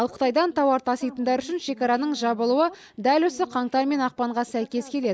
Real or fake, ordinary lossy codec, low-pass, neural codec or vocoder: real; none; none; none